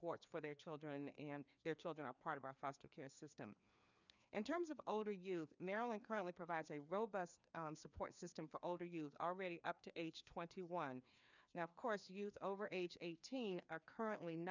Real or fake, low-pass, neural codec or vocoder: fake; 7.2 kHz; codec, 16 kHz, 2 kbps, FreqCodec, larger model